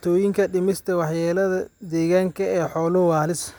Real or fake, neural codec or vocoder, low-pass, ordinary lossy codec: real; none; none; none